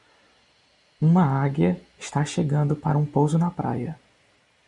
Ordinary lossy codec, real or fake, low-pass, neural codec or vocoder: MP3, 64 kbps; real; 10.8 kHz; none